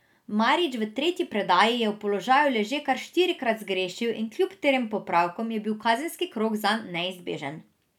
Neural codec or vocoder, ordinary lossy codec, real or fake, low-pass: none; none; real; 19.8 kHz